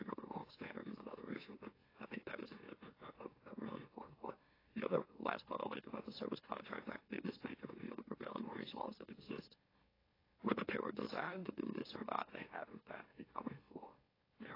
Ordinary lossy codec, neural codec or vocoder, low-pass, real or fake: AAC, 24 kbps; autoencoder, 44.1 kHz, a latent of 192 numbers a frame, MeloTTS; 5.4 kHz; fake